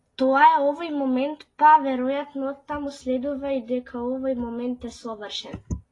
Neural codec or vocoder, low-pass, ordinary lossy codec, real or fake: none; 10.8 kHz; AAC, 32 kbps; real